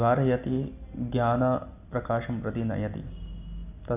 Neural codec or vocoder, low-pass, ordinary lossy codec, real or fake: none; 3.6 kHz; none; real